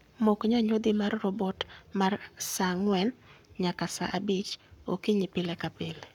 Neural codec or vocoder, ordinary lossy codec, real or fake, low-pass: codec, 44.1 kHz, 7.8 kbps, Pupu-Codec; none; fake; 19.8 kHz